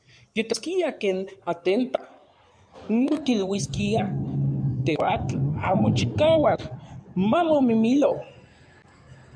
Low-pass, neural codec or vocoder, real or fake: 9.9 kHz; codec, 16 kHz in and 24 kHz out, 2.2 kbps, FireRedTTS-2 codec; fake